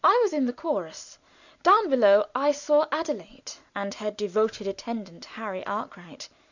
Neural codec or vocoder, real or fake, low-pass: none; real; 7.2 kHz